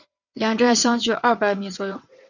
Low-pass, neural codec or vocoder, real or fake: 7.2 kHz; vocoder, 22.05 kHz, 80 mel bands, WaveNeXt; fake